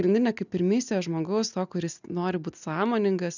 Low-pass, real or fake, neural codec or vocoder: 7.2 kHz; real; none